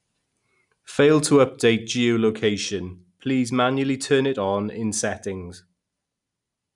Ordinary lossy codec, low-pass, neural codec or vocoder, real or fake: none; 10.8 kHz; none; real